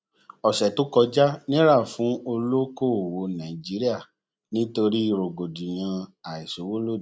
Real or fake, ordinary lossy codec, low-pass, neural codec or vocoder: real; none; none; none